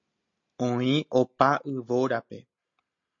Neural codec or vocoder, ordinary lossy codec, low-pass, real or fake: none; MP3, 48 kbps; 7.2 kHz; real